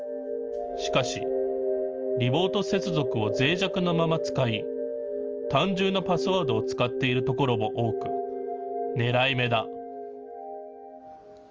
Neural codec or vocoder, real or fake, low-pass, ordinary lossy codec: none; real; 7.2 kHz; Opus, 24 kbps